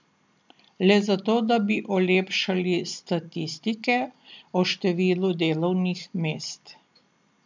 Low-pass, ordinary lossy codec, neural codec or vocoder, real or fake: 7.2 kHz; MP3, 64 kbps; none; real